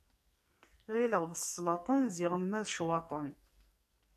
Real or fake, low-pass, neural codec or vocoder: fake; 14.4 kHz; codec, 44.1 kHz, 2.6 kbps, SNAC